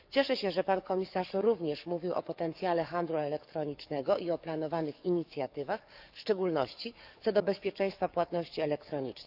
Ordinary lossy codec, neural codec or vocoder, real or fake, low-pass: none; codec, 44.1 kHz, 7.8 kbps, DAC; fake; 5.4 kHz